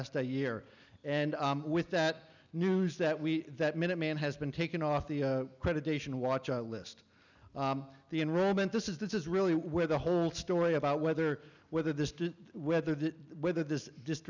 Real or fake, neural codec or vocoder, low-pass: real; none; 7.2 kHz